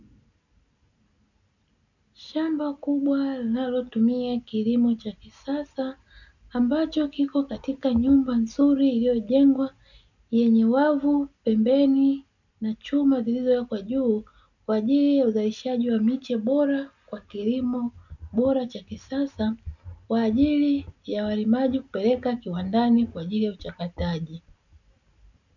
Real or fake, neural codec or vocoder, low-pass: real; none; 7.2 kHz